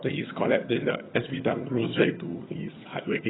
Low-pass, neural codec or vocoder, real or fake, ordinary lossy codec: 7.2 kHz; vocoder, 22.05 kHz, 80 mel bands, HiFi-GAN; fake; AAC, 16 kbps